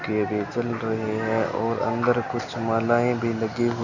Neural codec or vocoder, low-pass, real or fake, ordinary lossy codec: none; 7.2 kHz; real; none